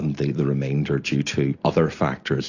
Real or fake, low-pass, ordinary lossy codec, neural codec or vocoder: real; 7.2 kHz; AAC, 48 kbps; none